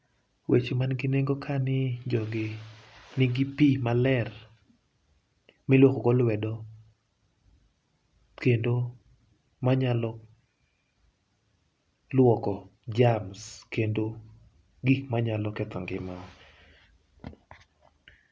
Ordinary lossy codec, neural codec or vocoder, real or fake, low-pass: none; none; real; none